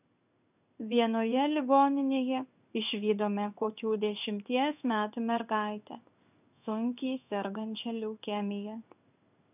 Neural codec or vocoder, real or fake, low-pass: codec, 16 kHz in and 24 kHz out, 1 kbps, XY-Tokenizer; fake; 3.6 kHz